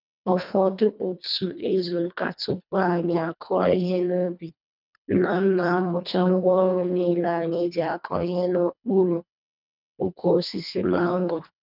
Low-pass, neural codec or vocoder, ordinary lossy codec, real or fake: 5.4 kHz; codec, 24 kHz, 1.5 kbps, HILCodec; none; fake